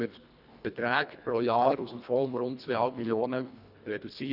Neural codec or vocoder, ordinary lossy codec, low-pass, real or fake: codec, 24 kHz, 1.5 kbps, HILCodec; none; 5.4 kHz; fake